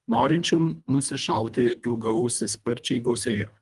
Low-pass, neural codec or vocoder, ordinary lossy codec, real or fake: 10.8 kHz; codec, 24 kHz, 1.5 kbps, HILCodec; Opus, 32 kbps; fake